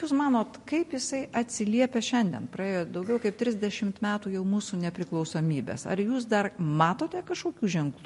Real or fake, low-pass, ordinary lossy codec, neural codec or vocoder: real; 14.4 kHz; MP3, 48 kbps; none